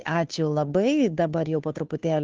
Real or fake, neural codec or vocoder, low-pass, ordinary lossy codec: fake; codec, 16 kHz, 2 kbps, FunCodec, trained on Chinese and English, 25 frames a second; 7.2 kHz; Opus, 32 kbps